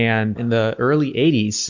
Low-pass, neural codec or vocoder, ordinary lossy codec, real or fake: 7.2 kHz; none; Opus, 64 kbps; real